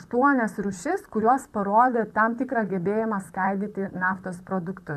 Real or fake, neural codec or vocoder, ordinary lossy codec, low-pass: fake; vocoder, 44.1 kHz, 128 mel bands, Pupu-Vocoder; MP3, 96 kbps; 14.4 kHz